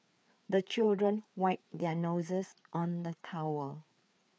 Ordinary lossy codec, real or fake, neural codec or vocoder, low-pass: none; fake; codec, 16 kHz, 4 kbps, FreqCodec, larger model; none